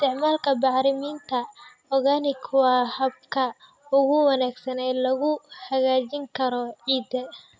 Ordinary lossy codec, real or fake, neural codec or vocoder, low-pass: none; real; none; none